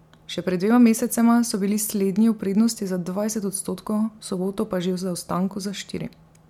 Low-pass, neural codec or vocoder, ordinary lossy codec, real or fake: 19.8 kHz; none; MP3, 96 kbps; real